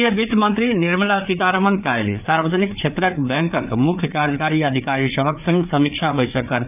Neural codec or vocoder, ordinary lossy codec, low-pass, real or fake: codec, 16 kHz, 4 kbps, FreqCodec, larger model; none; 3.6 kHz; fake